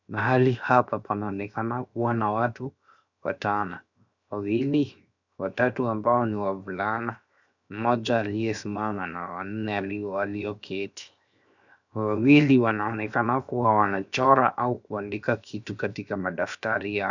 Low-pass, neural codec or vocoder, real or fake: 7.2 kHz; codec, 16 kHz, 0.7 kbps, FocalCodec; fake